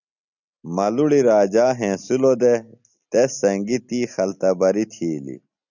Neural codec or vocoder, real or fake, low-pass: none; real; 7.2 kHz